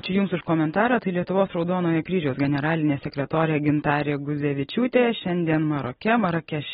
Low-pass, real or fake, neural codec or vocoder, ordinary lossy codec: 10.8 kHz; real; none; AAC, 16 kbps